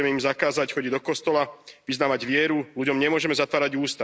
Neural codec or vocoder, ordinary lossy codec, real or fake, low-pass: none; none; real; none